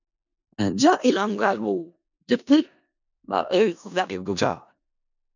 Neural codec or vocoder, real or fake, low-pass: codec, 16 kHz in and 24 kHz out, 0.4 kbps, LongCat-Audio-Codec, four codebook decoder; fake; 7.2 kHz